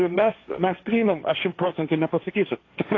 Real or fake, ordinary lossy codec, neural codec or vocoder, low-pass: fake; AAC, 32 kbps; codec, 16 kHz, 1.1 kbps, Voila-Tokenizer; 7.2 kHz